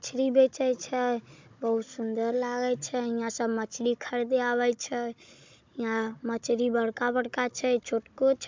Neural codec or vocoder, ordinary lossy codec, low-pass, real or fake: codec, 16 kHz, 16 kbps, FunCodec, trained on Chinese and English, 50 frames a second; MP3, 64 kbps; 7.2 kHz; fake